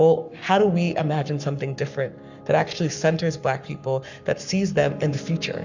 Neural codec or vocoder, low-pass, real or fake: codec, 44.1 kHz, 7.8 kbps, Pupu-Codec; 7.2 kHz; fake